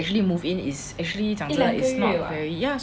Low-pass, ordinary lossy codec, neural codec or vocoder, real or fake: none; none; none; real